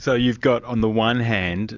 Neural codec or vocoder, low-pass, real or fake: none; 7.2 kHz; real